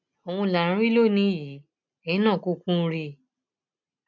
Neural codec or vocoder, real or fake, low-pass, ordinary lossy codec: none; real; 7.2 kHz; none